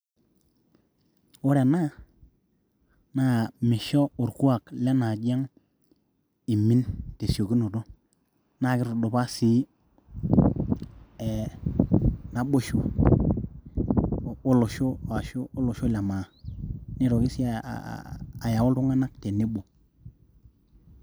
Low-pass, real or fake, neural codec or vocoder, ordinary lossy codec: none; real; none; none